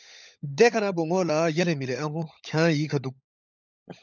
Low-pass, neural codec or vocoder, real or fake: 7.2 kHz; codec, 16 kHz, 16 kbps, FunCodec, trained on LibriTTS, 50 frames a second; fake